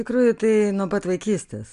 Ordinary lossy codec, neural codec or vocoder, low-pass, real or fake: MP3, 64 kbps; none; 10.8 kHz; real